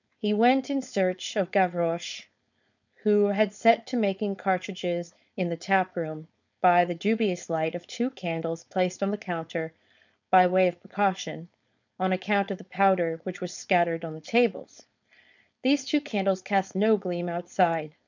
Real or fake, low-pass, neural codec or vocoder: fake; 7.2 kHz; codec, 16 kHz, 4.8 kbps, FACodec